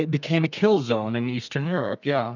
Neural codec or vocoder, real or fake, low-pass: codec, 32 kHz, 1.9 kbps, SNAC; fake; 7.2 kHz